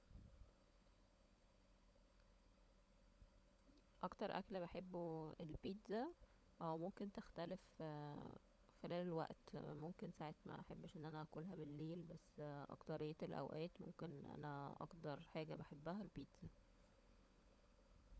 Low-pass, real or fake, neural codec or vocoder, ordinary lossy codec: none; fake; codec, 16 kHz, 16 kbps, FunCodec, trained on LibriTTS, 50 frames a second; none